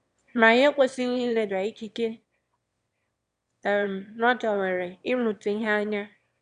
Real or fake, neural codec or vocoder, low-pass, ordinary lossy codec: fake; autoencoder, 22.05 kHz, a latent of 192 numbers a frame, VITS, trained on one speaker; 9.9 kHz; Opus, 64 kbps